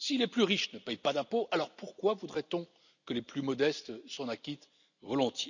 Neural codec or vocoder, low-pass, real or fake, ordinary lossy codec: none; 7.2 kHz; real; none